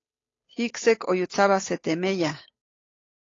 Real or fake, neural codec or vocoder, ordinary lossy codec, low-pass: fake; codec, 16 kHz, 8 kbps, FunCodec, trained on Chinese and English, 25 frames a second; AAC, 32 kbps; 7.2 kHz